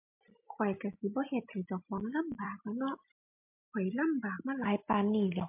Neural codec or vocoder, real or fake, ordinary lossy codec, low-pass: none; real; MP3, 32 kbps; 3.6 kHz